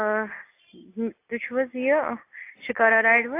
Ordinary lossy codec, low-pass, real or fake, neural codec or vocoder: AAC, 24 kbps; 3.6 kHz; real; none